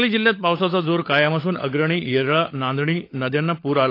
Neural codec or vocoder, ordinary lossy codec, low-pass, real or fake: codec, 16 kHz, 16 kbps, FunCodec, trained on LibriTTS, 50 frames a second; AAC, 32 kbps; 5.4 kHz; fake